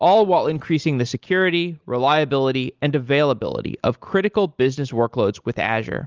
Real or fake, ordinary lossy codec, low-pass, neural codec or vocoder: real; Opus, 24 kbps; 7.2 kHz; none